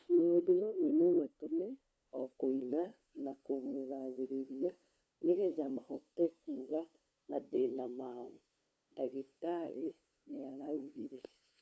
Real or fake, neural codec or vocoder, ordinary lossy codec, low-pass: fake; codec, 16 kHz, 2 kbps, FunCodec, trained on LibriTTS, 25 frames a second; none; none